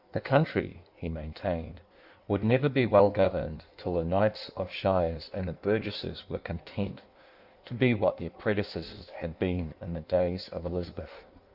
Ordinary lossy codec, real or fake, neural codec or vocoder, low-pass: Opus, 64 kbps; fake; codec, 16 kHz in and 24 kHz out, 1.1 kbps, FireRedTTS-2 codec; 5.4 kHz